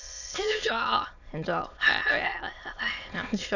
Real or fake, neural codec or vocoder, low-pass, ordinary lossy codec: fake; autoencoder, 22.05 kHz, a latent of 192 numbers a frame, VITS, trained on many speakers; 7.2 kHz; none